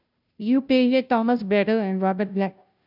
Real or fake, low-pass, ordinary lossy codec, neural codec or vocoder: fake; 5.4 kHz; none; codec, 16 kHz, 0.5 kbps, FunCodec, trained on Chinese and English, 25 frames a second